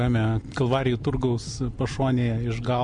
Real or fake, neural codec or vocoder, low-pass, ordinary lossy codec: real; none; 9.9 kHz; MP3, 64 kbps